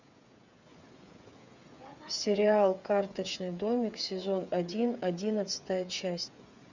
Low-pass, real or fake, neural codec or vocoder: 7.2 kHz; fake; codec, 16 kHz, 8 kbps, FreqCodec, smaller model